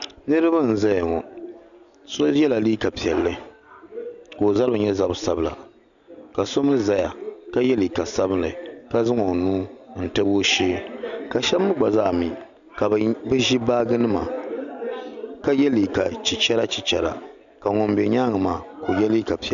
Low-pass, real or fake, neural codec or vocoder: 7.2 kHz; real; none